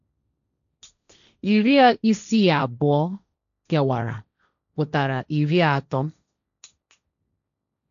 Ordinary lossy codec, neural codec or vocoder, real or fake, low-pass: none; codec, 16 kHz, 1.1 kbps, Voila-Tokenizer; fake; 7.2 kHz